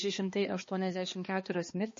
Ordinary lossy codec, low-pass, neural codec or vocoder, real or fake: MP3, 32 kbps; 7.2 kHz; codec, 16 kHz, 2 kbps, X-Codec, HuBERT features, trained on balanced general audio; fake